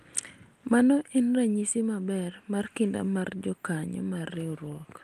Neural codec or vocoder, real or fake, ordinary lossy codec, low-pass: none; real; Opus, 32 kbps; 14.4 kHz